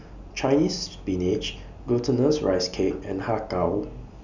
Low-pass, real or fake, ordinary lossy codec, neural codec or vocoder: 7.2 kHz; real; none; none